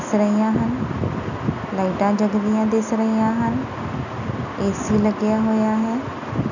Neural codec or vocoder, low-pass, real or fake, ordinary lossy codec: none; 7.2 kHz; real; none